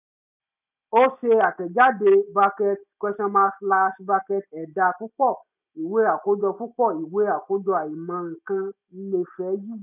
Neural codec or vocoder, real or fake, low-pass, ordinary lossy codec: none; real; 3.6 kHz; none